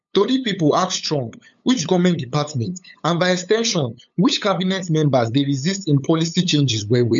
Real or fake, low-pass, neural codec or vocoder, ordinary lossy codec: fake; 7.2 kHz; codec, 16 kHz, 8 kbps, FunCodec, trained on LibriTTS, 25 frames a second; MP3, 64 kbps